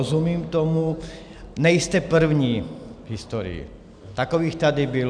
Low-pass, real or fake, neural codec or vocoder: 9.9 kHz; real; none